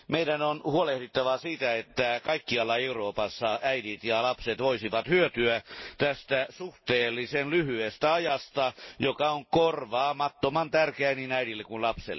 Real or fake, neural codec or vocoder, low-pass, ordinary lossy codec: real; none; 7.2 kHz; MP3, 24 kbps